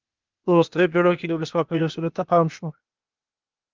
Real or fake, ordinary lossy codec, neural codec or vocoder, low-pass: fake; Opus, 24 kbps; codec, 16 kHz, 0.8 kbps, ZipCodec; 7.2 kHz